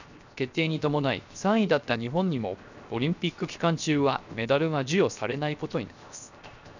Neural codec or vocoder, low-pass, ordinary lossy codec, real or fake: codec, 16 kHz, 0.7 kbps, FocalCodec; 7.2 kHz; none; fake